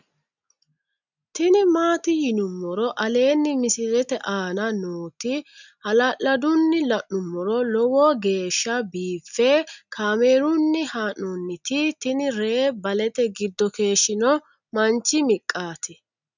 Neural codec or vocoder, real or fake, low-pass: none; real; 7.2 kHz